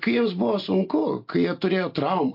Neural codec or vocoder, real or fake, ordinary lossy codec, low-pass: none; real; MP3, 32 kbps; 5.4 kHz